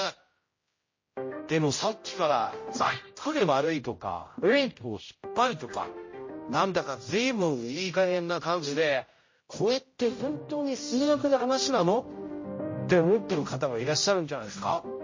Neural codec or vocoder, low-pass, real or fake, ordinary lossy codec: codec, 16 kHz, 0.5 kbps, X-Codec, HuBERT features, trained on general audio; 7.2 kHz; fake; MP3, 32 kbps